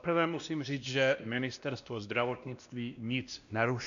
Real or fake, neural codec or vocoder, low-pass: fake; codec, 16 kHz, 1 kbps, X-Codec, WavLM features, trained on Multilingual LibriSpeech; 7.2 kHz